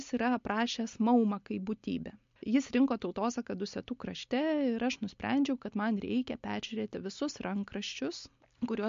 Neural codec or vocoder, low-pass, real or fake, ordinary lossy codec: none; 7.2 kHz; real; MP3, 48 kbps